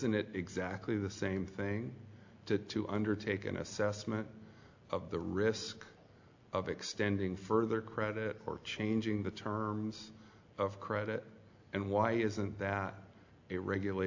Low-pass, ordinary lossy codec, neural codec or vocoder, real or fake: 7.2 kHz; AAC, 48 kbps; none; real